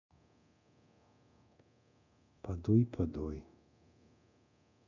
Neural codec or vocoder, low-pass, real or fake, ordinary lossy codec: codec, 24 kHz, 0.9 kbps, DualCodec; 7.2 kHz; fake; none